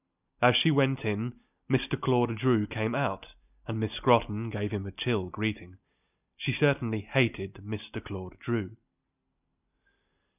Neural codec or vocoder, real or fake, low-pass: none; real; 3.6 kHz